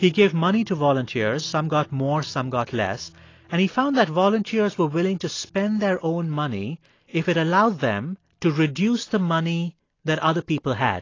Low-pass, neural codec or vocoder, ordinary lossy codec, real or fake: 7.2 kHz; none; AAC, 32 kbps; real